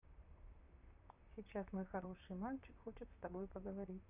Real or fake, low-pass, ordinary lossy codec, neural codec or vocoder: fake; 3.6 kHz; none; vocoder, 44.1 kHz, 128 mel bands, Pupu-Vocoder